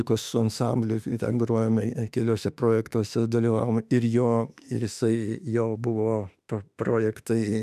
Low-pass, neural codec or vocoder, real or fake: 14.4 kHz; autoencoder, 48 kHz, 32 numbers a frame, DAC-VAE, trained on Japanese speech; fake